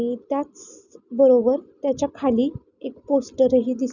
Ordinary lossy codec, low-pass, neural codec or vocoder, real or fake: none; 7.2 kHz; none; real